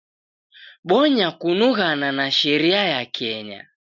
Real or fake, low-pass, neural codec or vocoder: real; 7.2 kHz; none